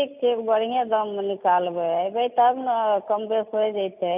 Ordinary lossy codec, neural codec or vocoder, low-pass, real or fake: none; none; 3.6 kHz; real